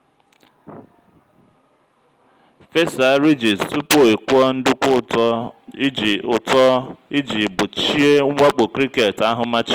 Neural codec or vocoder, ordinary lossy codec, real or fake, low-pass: none; Opus, 24 kbps; real; 19.8 kHz